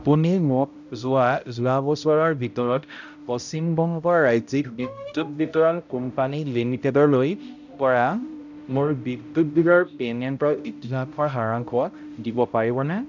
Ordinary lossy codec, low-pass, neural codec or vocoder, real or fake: none; 7.2 kHz; codec, 16 kHz, 0.5 kbps, X-Codec, HuBERT features, trained on balanced general audio; fake